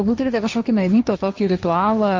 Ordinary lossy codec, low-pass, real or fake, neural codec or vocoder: Opus, 32 kbps; 7.2 kHz; fake; codec, 16 kHz, 1.1 kbps, Voila-Tokenizer